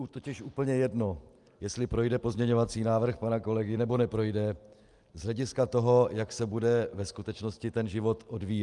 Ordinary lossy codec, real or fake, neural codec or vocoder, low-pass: Opus, 32 kbps; real; none; 10.8 kHz